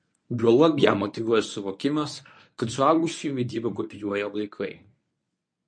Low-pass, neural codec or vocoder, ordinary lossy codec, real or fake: 9.9 kHz; codec, 24 kHz, 0.9 kbps, WavTokenizer, medium speech release version 1; MP3, 48 kbps; fake